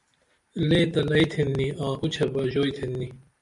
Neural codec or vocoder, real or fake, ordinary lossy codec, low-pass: vocoder, 44.1 kHz, 128 mel bands every 512 samples, BigVGAN v2; fake; MP3, 96 kbps; 10.8 kHz